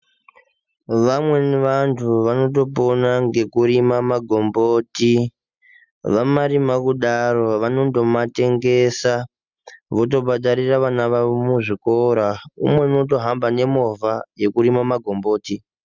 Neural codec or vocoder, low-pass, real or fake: none; 7.2 kHz; real